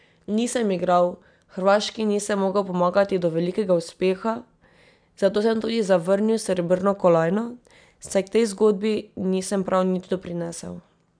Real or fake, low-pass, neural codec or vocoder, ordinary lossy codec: real; 9.9 kHz; none; none